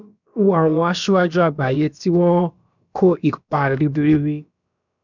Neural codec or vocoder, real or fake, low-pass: codec, 16 kHz, about 1 kbps, DyCAST, with the encoder's durations; fake; 7.2 kHz